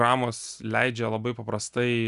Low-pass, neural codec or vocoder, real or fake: 10.8 kHz; none; real